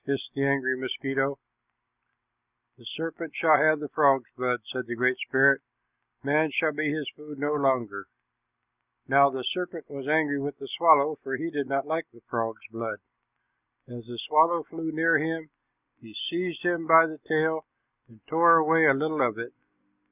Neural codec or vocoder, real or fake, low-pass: none; real; 3.6 kHz